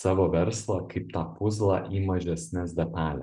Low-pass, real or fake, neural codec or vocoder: 10.8 kHz; real; none